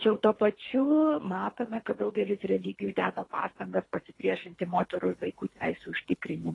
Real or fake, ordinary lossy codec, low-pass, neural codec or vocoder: fake; AAC, 32 kbps; 10.8 kHz; codec, 24 kHz, 3 kbps, HILCodec